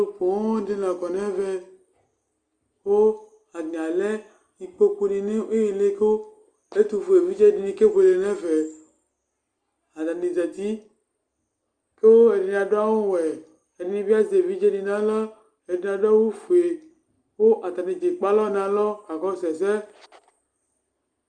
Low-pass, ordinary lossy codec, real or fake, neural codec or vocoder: 9.9 kHz; Opus, 32 kbps; real; none